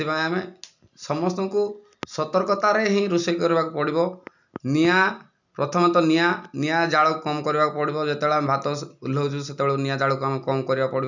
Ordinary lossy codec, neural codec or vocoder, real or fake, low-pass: none; none; real; 7.2 kHz